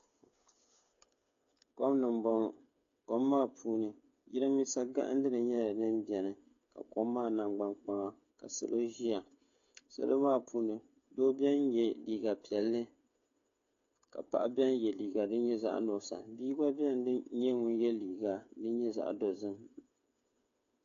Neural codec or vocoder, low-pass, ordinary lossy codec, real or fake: codec, 16 kHz, 8 kbps, FreqCodec, smaller model; 7.2 kHz; AAC, 48 kbps; fake